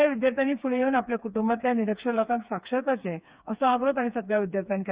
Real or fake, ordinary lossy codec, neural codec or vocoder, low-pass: fake; Opus, 64 kbps; codec, 16 kHz, 4 kbps, FreqCodec, smaller model; 3.6 kHz